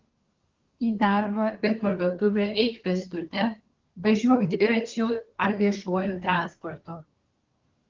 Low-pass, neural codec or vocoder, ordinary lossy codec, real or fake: 7.2 kHz; codec, 24 kHz, 1 kbps, SNAC; Opus, 32 kbps; fake